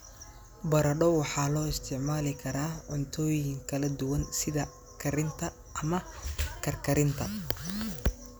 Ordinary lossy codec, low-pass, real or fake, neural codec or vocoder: none; none; real; none